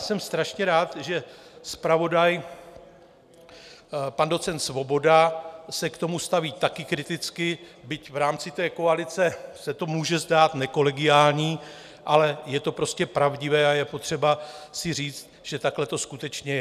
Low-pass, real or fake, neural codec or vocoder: 14.4 kHz; real; none